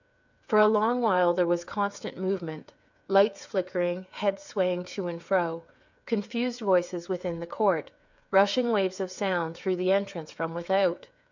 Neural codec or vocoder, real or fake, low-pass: codec, 16 kHz, 8 kbps, FreqCodec, smaller model; fake; 7.2 kHz